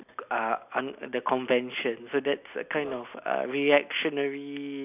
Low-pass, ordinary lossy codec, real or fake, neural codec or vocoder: 3.6 kHz; none; real; none